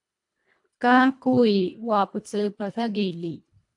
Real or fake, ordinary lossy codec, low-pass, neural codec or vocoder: fake; AAC, 64 kbps; 10.8 kHz; codec, 24 kHz, 1.5 kbps, HILCodec